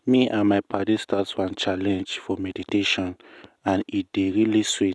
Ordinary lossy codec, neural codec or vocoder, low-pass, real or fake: none; none; none; real